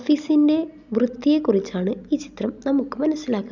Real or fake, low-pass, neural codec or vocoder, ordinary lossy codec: real; 7.2 kHz; none; none